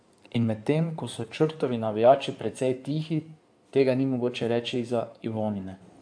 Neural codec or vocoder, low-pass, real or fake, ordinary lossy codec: codec, 16 kHz in and 24 kHz out, 2.2 kbps, FireRedTTS-2 codec; 9.9 kHz; fake; AAC, 64 kbps